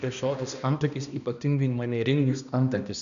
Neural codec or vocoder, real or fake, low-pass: codec, 16 kHz, 1 kbps, X-Codec, HuBERT features, trained on balanced general audio; fake; 7.2 kHz